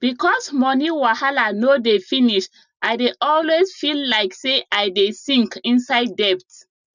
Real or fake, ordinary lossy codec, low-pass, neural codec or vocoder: real; none; 7.2 kHz; none